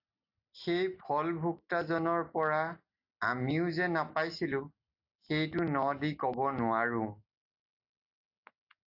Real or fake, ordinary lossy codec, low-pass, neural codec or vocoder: real; AAC, 48 kbps; 5.4 kHz; none